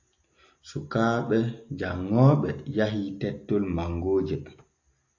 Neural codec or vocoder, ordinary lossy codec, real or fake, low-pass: none; AAC, 48 kbps; real; 7.2 kHz